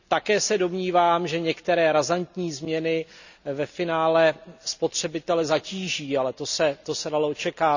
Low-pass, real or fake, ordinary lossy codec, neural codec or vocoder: 7.2 kHz; real; none; none